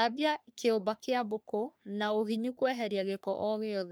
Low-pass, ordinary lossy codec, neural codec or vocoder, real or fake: none; none; codec, 44.1 kHz, 3.4 kbps, Pupu-Codec; fake